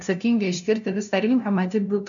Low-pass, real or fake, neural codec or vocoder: 7.2 kHz; fake; codec, 16 kHz, 0.5 kbps, FunCodec, trained on LibriTTS, 25 frames a second